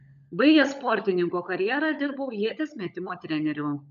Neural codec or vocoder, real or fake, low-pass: codec, 16 kHz, 16 kbps, FunCodec, trained on LibriTTS, 50 frames a second; fake; 7.2 kHz